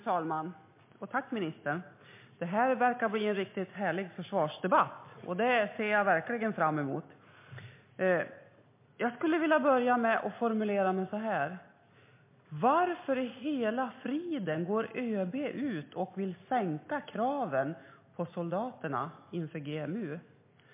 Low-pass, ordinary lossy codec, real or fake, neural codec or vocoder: 3.6 kHz; MP3, 24 kbps; real; none